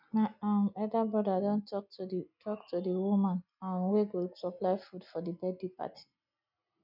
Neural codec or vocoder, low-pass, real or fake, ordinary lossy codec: none; 5.4 kHz; real; none